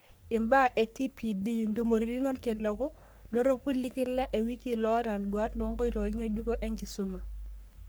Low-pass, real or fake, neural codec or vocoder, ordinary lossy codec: none; fake; codec, 44.1 kHz, 3.4 kbps, Pupu-Codec; none